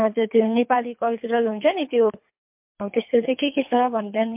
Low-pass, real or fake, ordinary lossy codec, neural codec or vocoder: 3.6 kHz; fake; MP3, 32 kbps; codec, 24 kHz, 3 kbps, HILCodec